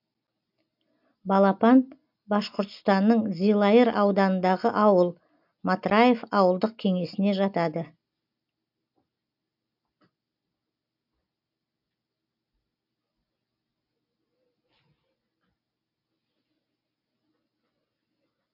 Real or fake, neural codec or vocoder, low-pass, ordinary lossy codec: real; none; 5.4 kHz; MP3, 48 kbps